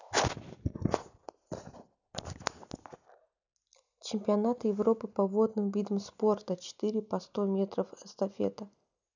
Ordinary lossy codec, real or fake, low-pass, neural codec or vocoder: none; real; 7.2 kHz; none